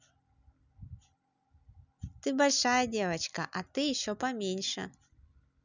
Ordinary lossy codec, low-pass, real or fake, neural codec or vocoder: none; 7.2 kHz; real; none